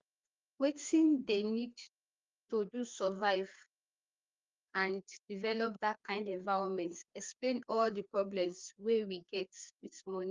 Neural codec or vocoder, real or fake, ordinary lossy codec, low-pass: codec, 16 kHz, 2 kbps, FreqCodec, larger model; fake; Opus, 32 kbps; 7.2 kHz